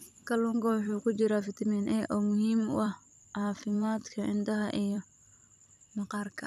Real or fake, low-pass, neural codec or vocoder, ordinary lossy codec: fake; 14.4 kHz; vocoder, 44.1 kHz, 128 mel bands every 256 samples, BigVGAN v2; none